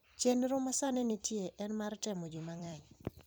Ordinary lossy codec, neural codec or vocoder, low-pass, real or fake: none; vocoder, 44.1 kHz, 128 mel bands every 256 samples, BigVGAN v2; none; fake